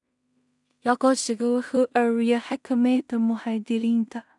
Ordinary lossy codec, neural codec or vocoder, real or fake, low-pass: none; codec, 16 kHz in and 24 kHz out, 0.4 kbps, LongCat-Audio-Codec, two codebook decoder; fake; 10.8 kHz